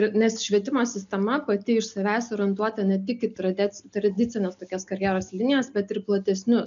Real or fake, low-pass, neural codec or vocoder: real; 7.2 kHz; none